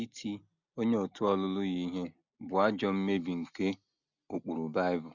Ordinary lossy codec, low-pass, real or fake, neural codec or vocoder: none; 7.2 kHz; real; none